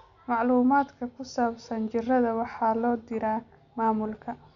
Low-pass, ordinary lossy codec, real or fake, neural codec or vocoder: 7.2 kHz; none; real; none